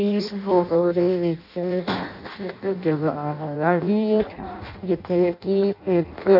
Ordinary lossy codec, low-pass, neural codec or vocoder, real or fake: none; 5.4 kHz; codec, 16 kHz in and 24 kHz out, 0.6 kbps, FireRedTTS-2 codec; fake